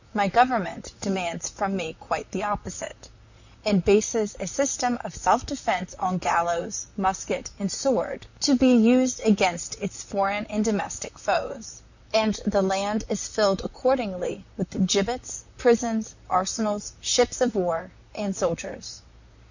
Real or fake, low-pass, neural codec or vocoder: fake; 7.2 kHz; vocoder, 44.1 kHz, 128 mel bands, Pupu-Vocoder